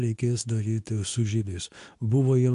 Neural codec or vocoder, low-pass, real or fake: codec, 24 kHz, 0.9 kbps, WavTokenizer, medium speech release version 2; 10.8 kHz; fake